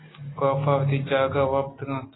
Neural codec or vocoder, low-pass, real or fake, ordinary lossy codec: none; 7.2 kHz; real; AAC, 16 kbps